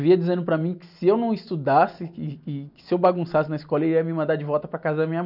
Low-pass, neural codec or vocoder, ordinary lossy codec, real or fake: 5.4 kHz; none; none; real